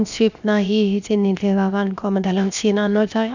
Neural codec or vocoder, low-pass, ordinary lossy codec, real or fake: codec, 16 kHz, 0.7 kbps, FocalCodec; 7.2 kHz; none; fake